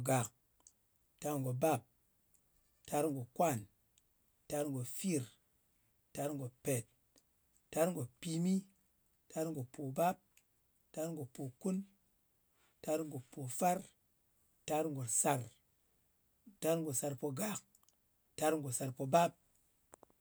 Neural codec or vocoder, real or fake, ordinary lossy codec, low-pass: none; real; none; none